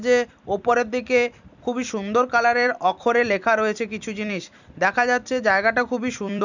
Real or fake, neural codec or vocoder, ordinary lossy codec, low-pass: fake; vocoder, 44.1 kHz, 128 mel bands every 256 samples, BigVGAN v2; none; 7.2 kHz